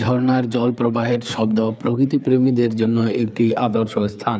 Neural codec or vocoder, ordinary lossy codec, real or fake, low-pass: codec, 16 kHz, 4 kbps, FreqCodec, larger model; none; fake; none